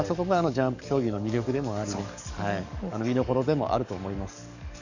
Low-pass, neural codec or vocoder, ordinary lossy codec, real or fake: 7.2 kHz; codec, 44.1 kHz, 7.8 kbps, DAC; none; fake